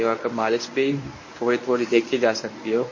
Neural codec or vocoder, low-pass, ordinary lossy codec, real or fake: codec, 24 kHz, 0.9 kbps, WavTokenizer, medium speech release version 1; 7.2 kHz; MP3, 32 kbps; fake